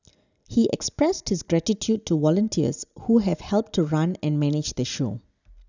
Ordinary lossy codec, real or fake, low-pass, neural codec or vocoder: none; real; 7.2 kHz; none